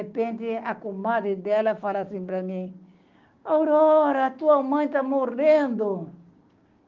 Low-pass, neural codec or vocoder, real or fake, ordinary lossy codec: 7.2 kHz; codec, 16 kHz, 6 kbps, DAC; fake; Opus, 32 kbps